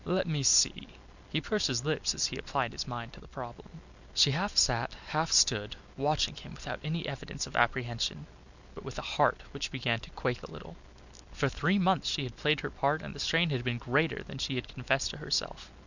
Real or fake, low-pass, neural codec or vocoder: real; 7.2 kHz; none